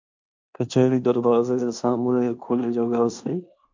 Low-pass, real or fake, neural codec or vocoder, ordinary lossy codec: 7.2 kHz; fake; codec, 16 kHz in and 24 kHz out, 0.9 kbps, LongCat-Audio-Codec, fine tuned four codebook decoder; MP3, 48 kbps